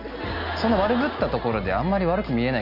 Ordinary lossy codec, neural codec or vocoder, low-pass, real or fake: none; none; 5.4 kHz; real